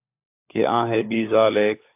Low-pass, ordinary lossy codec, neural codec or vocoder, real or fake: 3.6 kHz; AAC, 24 kbps; codec, 16 kHz, 16 kbps, FunCodec, trained on LibriTTS, 50 frames a second; fake